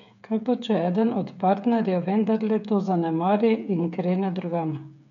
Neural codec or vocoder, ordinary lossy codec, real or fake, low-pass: codec, 16 kHz, 8 kbps, FreqCodec, smaller model; none; fake; 7.2 kHz